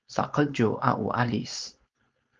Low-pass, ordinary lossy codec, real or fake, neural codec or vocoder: 7.2 kHz; Opus, 24 kbps; fake; codec, 16 kHz, 4.8 kbps, FACodec